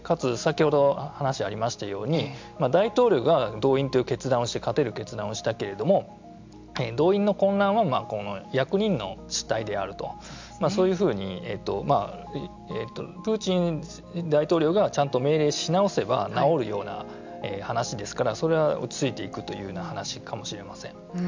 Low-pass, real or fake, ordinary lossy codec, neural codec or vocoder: 7.2 kHz; real; MP3, 64 kbps; none